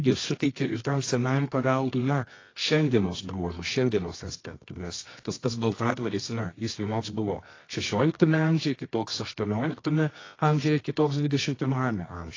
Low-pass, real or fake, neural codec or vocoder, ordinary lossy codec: 7.2 kHz; fake; codec, 24 kHz, 0.9 kbps, WavTokenizer, medium music audio release; AAC, 32 kbps